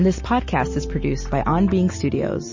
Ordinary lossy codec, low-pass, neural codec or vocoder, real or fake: MP3, 32 kbps; 7.2 kHz; none; real